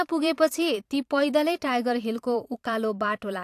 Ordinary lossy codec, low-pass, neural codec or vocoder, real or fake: AAC, 64 kbps; 14.4 kHz; vocoder, 44.1 kHz, 128 mel bands every 512 samples, BigVGAN v2; fake